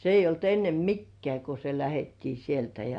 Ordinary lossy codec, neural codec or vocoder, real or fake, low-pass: Opus, 64 kbps; none; real; 9.9 kHz